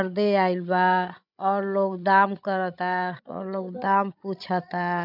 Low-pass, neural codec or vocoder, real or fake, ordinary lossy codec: 5.4 kHz; codec, 16 kHz, 16 kbps, FreqCodec, larger model; fake; none